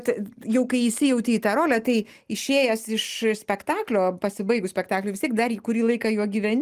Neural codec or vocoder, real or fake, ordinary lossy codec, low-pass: none; real; Opus, 24 kbps; 14.4 kHz